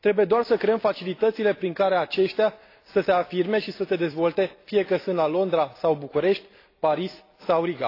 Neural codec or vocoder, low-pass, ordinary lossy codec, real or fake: none; 5.4 kHz; AAC, 32 kbps; real